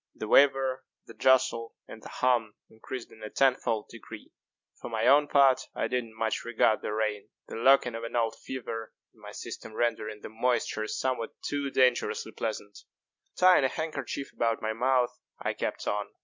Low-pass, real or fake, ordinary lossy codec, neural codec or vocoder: 7.2 kHz; real; MP3, 64 kbps; none